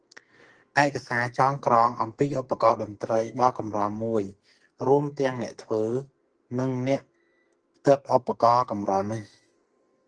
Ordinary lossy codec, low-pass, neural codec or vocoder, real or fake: Opus, 24 kbps; 9.9 kHz; codec, 44.1 kHz, 2.6 kbps, SNAC; fake